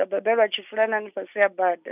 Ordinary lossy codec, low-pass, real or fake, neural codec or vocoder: none; 3.6 kHz; real; none